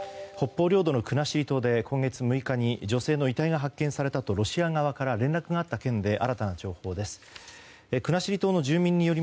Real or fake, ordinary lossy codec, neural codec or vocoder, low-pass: real; none; none; none